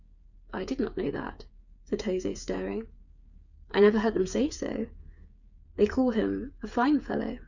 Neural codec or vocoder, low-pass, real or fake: codec, 16 kHz, 8 kbps, FreqCodec, smaller model; 7.2 kHz; fake